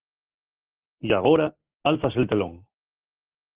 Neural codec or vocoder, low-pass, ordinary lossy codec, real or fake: none; 3.6 kHz; Opus, 16 kbps; real